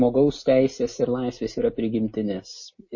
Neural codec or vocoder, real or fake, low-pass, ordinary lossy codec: none; real; 7.2 kHz; MP3, 32 kbps